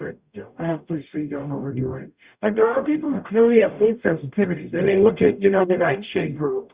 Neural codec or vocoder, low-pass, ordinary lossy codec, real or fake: codec, 44.1 kHz, 0.9 kbps, DAC; 3.6 kHz; Opus, 64 kbps; fake